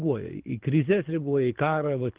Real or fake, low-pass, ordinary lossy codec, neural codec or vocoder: fake; 3.6 kHz; Opus, 16 kbps; codec, 24 kHz, 6 kbps, HILCodec